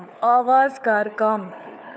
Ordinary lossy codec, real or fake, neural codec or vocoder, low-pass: none; fake; codec, 16 kHz, 4 kbps, FunCodec, trained on LibriTTS, 50 frames a second; none